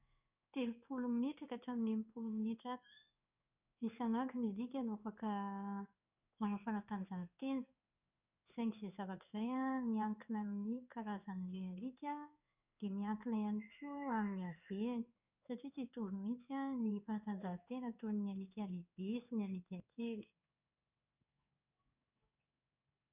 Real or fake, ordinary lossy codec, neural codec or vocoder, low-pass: fake; none; codec, 16 kHz in and 24 kHz out, 1 kbps, XY-Tokenizer; 3.6 kHz